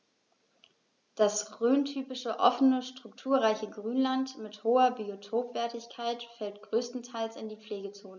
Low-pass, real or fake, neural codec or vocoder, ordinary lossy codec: 7.2 kHz; real; none; none